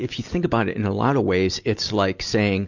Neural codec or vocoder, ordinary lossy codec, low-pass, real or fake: none; Opus, 64 kbps; 7.2 kHz; real